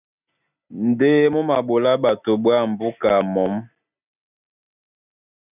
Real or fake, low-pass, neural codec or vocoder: real; 3.6 kHz; none